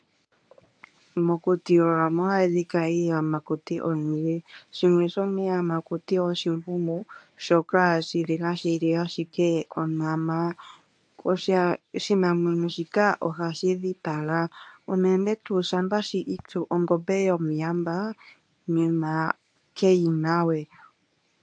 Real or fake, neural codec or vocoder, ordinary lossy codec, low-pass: fake; codec, 24 kHz, 0.9 kbps, WavTokenizer, medium speech release version 1; AAC, 64 kbps; 9.9 kHz